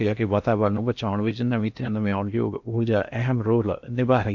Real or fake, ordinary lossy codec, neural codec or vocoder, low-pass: fake; MP3, 64 kbps; codec, 16 kHz in and 24 kHz out, 0.8 kbps, FocalCodec, streaming, 65536 codes; 7.2 kHz